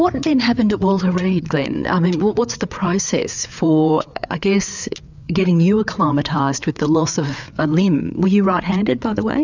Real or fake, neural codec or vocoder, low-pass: fake; codec, 16 kHz, 4 kbps, FreqCodec, larger model; 7.2 kHz